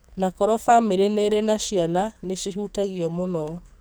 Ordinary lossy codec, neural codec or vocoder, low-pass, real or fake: none; codec, 44.1 kHz, 2.6 kbps, SNAC; none; fake